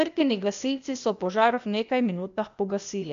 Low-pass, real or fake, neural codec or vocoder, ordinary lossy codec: 7.2 kHz; fake; codec, 16 kHz, 0.8 kbps, ZipCodec; AAC, 64 kbps